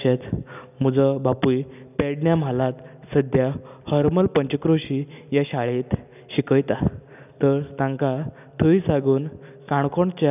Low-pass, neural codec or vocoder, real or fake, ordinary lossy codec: 3.6 kHz; none; real; none